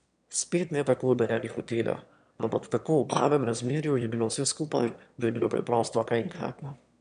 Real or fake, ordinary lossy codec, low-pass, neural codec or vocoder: fake; none; 9.9 kHz; autoencoder, 22.05 kHz, a latent of 192 numbers a frame, VITS, trained on one speaker